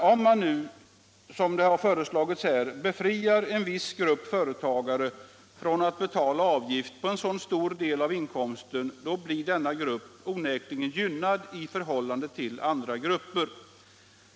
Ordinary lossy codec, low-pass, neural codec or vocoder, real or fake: none; none; none; real